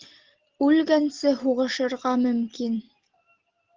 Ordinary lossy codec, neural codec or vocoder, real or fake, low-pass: Opus, 16 kbps; none; real; 7.2 kHz